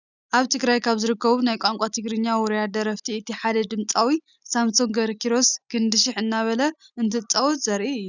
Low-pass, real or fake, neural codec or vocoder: 7.2 kHz; real; none